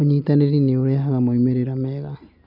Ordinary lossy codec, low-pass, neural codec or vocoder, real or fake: none; 5.4 kHz; none; real